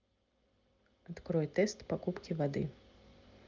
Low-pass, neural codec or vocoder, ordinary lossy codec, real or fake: 7.2 kHz; none; Opus, 24 kbps; real